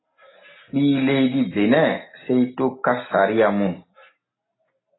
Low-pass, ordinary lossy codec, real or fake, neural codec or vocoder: 7.2 kHz; AAC, 16 kbps; real; none